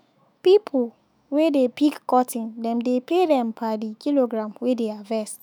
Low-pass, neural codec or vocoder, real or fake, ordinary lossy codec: none; autoencoder, 48 kHz, 128 numbers a frame, DAC-VAE, trained on Japanese speech; fake; none